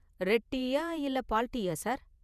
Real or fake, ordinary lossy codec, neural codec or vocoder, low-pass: fake; none; vocoder, 48 kHz, 128 mel bands, Vocos; 14.4 kHz